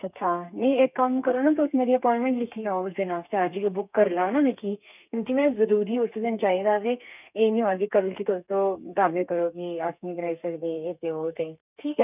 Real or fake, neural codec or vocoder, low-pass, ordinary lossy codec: fake; codec, 32 kHz, 1.9 kbps, SNAC; 3.6 kHz; none